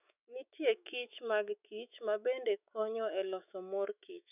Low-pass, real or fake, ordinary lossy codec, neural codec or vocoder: 3.6 kHz; fake; none; autoencoder, 48 kHz, 128 numbers a frame, DAC-VAE, trained on Japanese speech